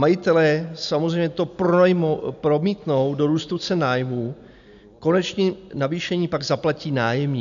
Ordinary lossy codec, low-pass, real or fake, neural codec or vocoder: AAC, 96 kbps; 7.2 kHz; real; none